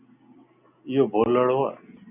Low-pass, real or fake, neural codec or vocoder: 3.6 kHz; real; none